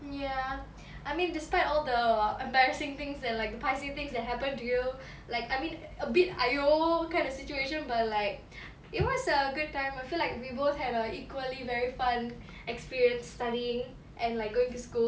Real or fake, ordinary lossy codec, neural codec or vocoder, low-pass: real; none; none; none